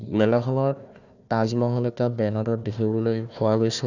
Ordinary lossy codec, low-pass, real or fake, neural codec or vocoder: none; 7.2 kHz; fake; codec, 16 kHz, 1 kbps, FunCodec, trained on Chinese and English, 50 frames a second